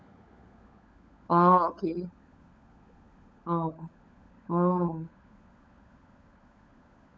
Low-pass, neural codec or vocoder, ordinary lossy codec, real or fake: none; codec, 16 kHz, 16 kbps, FunCodec, trained on LibriTTS, 50 frames a second; none; fake